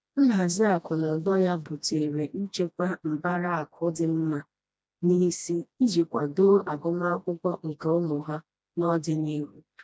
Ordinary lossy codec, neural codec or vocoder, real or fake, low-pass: none; codec, 16 kHz, 1 kbps, FreqCodec, smaller model; fake; none